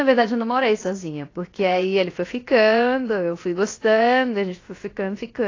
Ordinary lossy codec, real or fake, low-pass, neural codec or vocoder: AAC, 32 kbps; fake; 7.2 kHz; codec, 16 kHz, 0.7 kbps, FocalCodec